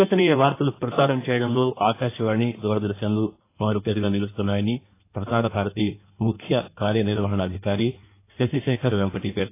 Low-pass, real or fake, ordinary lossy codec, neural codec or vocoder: 3.6 kHz; fake; AAC, 24 kbps; codec, 16 kHz in and 24 kHz out, 1.1 kbps, FireRedTTS-2 codec